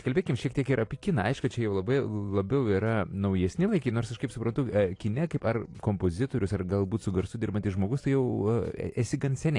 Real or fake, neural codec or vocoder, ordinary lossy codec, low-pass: real; none; AAC, 48 kbps; 10.8 kHz